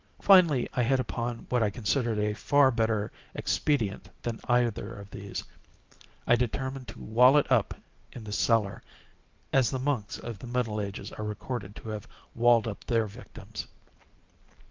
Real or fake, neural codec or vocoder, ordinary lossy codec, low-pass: real; none; Opus, 24 kbps; 7.2 kHz